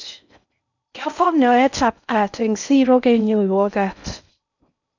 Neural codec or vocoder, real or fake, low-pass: codec, 16 kHz in and 24 kHz out, 0.6 kbps, FocalCodec, streaming, 4096 codes; fake; 7.2 kHz